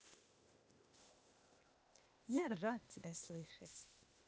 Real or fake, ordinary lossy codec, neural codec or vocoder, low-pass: fake; none; codec, 16 kHz, 0.8 kbps, ZipCodec; none